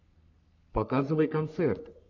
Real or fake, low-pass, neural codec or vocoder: fake; 7.2 kHz; codec, 44.1 kHz, 3.4 kbps, Pupu-Codec